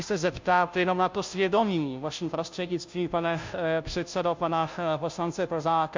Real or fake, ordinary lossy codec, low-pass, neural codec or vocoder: fake; MP3, 64 kbps; 7.2 kHz; codec, 16 kHz, 0.5 kbps, FunCodec, trained on Chinese and English, 25 frames a second